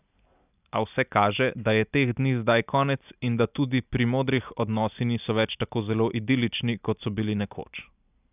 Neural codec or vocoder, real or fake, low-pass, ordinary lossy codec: none; real; 3.6 kHz; none